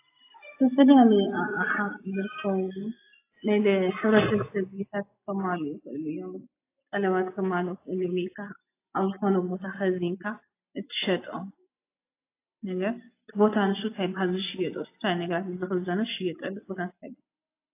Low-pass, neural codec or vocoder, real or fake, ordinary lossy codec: 3.6 kHz; none; real; AAC, 24 kbps